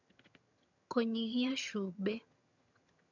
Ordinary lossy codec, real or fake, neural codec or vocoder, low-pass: none; fake; vocoder, 22.05 kHz, 80 mel bands, HiFi-GAN; 7.2 kHz